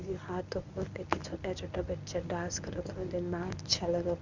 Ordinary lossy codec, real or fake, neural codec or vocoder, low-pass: none; fake; codec, 24 kHz, 0.9 kbps, WavTokenizer, medium speech release version 1; 7.2 kHz